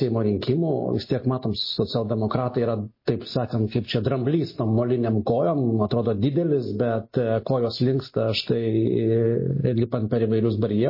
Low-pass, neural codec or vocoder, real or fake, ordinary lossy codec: 5.4 kHz; none; real; MP3, 24 kbps